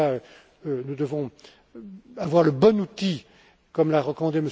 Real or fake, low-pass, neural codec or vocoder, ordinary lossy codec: real; none; none; none